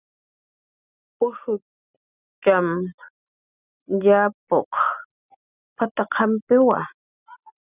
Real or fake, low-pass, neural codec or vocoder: real; 3.6 kHz; none